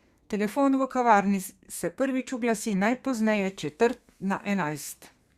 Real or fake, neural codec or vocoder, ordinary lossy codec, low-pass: fake; codec, 32 kHz, 1.9 kbps, SNAC; Opus, 64 kbps; 14.4 kHz